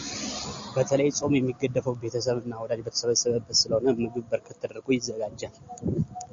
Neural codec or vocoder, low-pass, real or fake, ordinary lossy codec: none; 7.2 kHz; real; MP3, 48 kbps